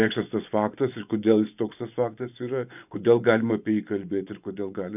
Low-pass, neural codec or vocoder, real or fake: 3.6 kHz; vocoder, 24 kHz, 100 mel bands, Vocos; fake